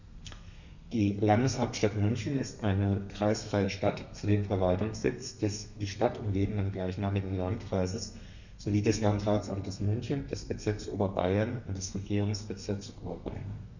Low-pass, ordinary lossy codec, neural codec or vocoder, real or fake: 7.2 kHz; none; codec, 32 kHz, 1.9 kbps, SNAC; fake